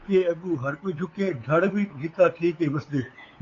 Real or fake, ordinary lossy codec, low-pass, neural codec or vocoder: fake; AAC, 32 kbps; 7.2 kHz; codec, 16 kHz, 8 kbps, FunCodec, trained on LibriTTS, 25 frames a second